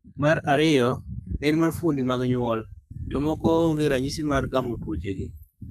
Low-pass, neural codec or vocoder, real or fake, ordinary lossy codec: 14.4 kHz; codec, 32 kHz, 1.9 kbps, SNAC; fake; none